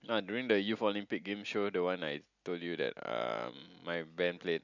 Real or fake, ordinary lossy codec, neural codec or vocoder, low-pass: real; none; none; 7.2 kHz